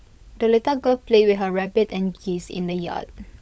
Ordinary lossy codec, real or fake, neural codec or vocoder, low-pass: none; fake; codec, 16 kHz, 16 kbps, FunCodec, trained on LibriTTS, 50 frames a second; none